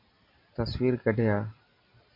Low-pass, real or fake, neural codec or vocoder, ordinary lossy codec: 5.4 kHz; real; none; MP3, 32 kbps